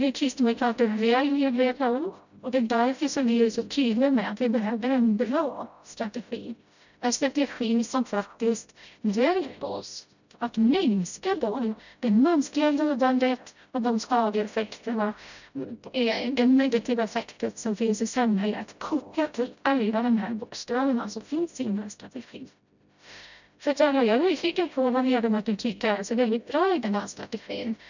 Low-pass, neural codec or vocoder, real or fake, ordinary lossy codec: 7.2 kHz; codec, 16 kHz, 0.5 kbps, FreqCodec, smaller model; fake; none